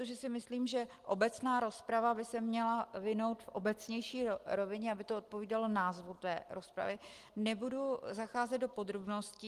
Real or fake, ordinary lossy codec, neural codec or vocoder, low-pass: real; Opus, 24 kbps; none; 14.4 kHz